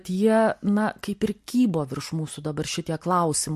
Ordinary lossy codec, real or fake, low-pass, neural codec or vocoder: MP3, 64 kbps; real; 14.4 kHz; none